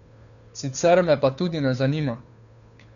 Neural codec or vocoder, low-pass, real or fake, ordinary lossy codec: codec, 16 kHz, 2 kbps, FunCodec, trained on Chinese and English, 25 frames a second; 7.2 kHz; fake; none